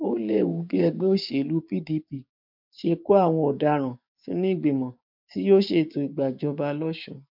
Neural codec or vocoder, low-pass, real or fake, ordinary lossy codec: codec, 16 kHz, 6 kbps, DAC; 5.4 kHz; fake; MP3, 48 kbps